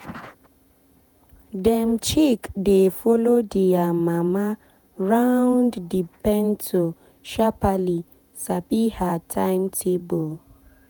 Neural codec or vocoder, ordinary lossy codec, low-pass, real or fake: vocoder, 48 kHz, 128 mel bands, Vocos; none; none; fake